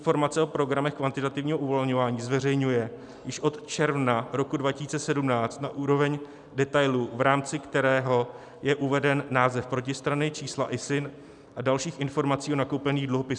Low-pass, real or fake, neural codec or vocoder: 10.8 kHz; real; none